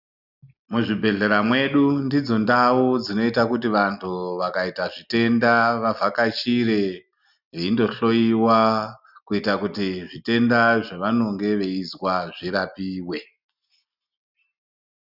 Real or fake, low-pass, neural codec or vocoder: real; 5.4 kHz; none